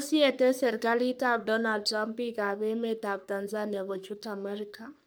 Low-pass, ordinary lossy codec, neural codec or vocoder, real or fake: none; none; codec, 44.1 kHz, 3.4 kbps, Pupu-Codec; fake